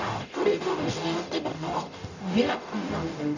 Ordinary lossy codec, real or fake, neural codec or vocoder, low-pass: none; fake; codec, 44.1 kHz, 0.9 kbps, DAC; 7.2 kHz